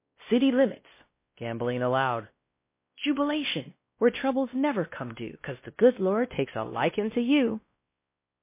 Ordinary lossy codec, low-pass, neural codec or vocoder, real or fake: MP3, 24 kbps; 3.6 kHz; codec, 16 kHz, 1 kbps, X-Codec, WavLM features, trained on Multilingual LibriSpeech; fake